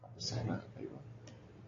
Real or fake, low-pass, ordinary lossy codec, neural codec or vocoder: real; 7.2 kHz; AAC, 32 kbps; none